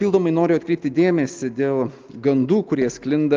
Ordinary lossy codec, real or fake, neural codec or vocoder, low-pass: Opus, 32 kbps; real; none; 7.2 kHz